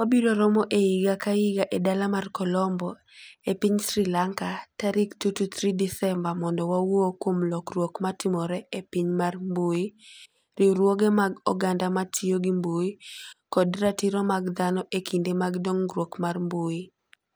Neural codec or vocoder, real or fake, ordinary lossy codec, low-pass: none; real; none; none